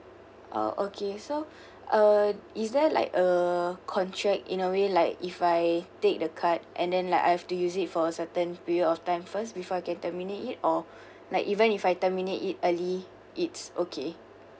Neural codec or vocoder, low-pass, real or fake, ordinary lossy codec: none; none; real; none